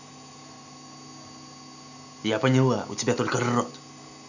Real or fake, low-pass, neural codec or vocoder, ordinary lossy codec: real; 7.2 kHz; none; none